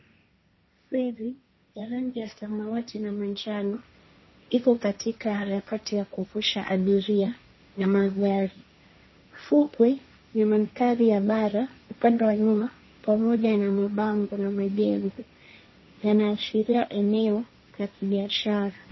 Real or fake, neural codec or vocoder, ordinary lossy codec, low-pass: fake; codec, 16 kHz, 1.1 kbps, Voila-Tokenizer; MP3, 24 kbps; 7.2 kHz